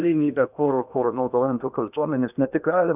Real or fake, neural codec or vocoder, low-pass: fake; codec, 16 kHz in and 24 kHz out, 0.8 kbps, FocalCodec, streaming, 65536 codes; 3.6 kHz